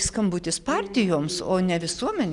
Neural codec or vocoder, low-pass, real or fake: none; 10.8 kHz; real